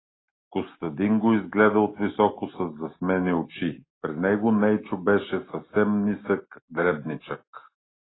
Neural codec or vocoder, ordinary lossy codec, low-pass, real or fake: none; AAC, 16 kbps; 7.2 kHz; real